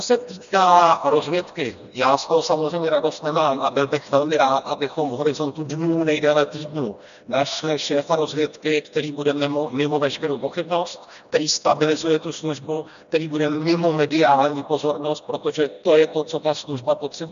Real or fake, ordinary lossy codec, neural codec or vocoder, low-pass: fake; MP3, 96 kbps; codec, 16 kHz, 1 kbps, FreqCodec, smaller model; 7.2 kHz